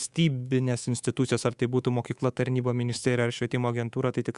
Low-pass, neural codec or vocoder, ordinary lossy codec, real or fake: 10.8 kHz; codec, 24 kHz, 3.1 kbps, DualCodec; Opus, 64 kbps; fake